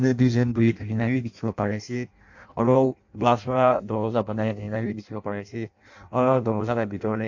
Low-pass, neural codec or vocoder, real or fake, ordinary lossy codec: 7.2 kHz; codec, 16 kHz in and 24 kHz out, 0.6 kbps, FireRedTTS-2 codec; fake; AAC, 48 kbps